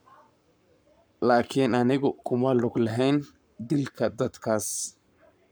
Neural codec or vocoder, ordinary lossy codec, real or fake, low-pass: codec, 44.1 kHz, 7.8 kbps, Pupu-Codec; none; fake; none